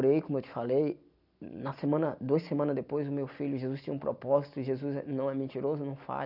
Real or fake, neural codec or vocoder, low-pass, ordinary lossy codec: real; none; 5.4 kHz; none